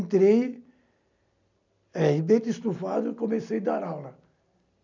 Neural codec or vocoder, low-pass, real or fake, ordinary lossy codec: none; 7.2 kHz; real; none